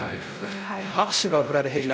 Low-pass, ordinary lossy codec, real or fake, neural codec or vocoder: none; none; fake; codec, 16 kHz, 0.5 kbps, X-Codec, WavLM features, trained on Multilingual LibriSpeech